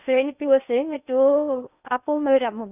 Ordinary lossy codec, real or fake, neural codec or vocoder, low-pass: none; fake; codec, 16 kHz in and 24 kHz out, 0.8 kbps, FocalCodec, streaming, 65536 codes; 3.6 kHz